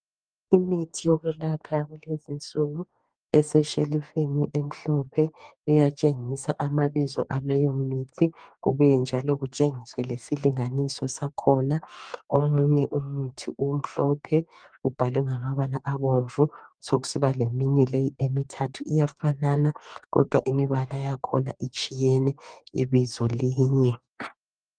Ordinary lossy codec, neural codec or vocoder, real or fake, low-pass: Opus, 32 kbps; codec, 44.1 kHz, 2.6 kbps, DAC; fake; 9.9 kHz